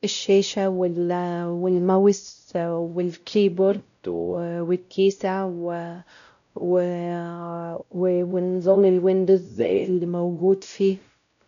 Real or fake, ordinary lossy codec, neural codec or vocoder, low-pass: fake; none; codec, 16 kHz, 0.5 kbps, X-Codec, WavLM features, trained on Multilingual LibriSpeech; 7.2 kHz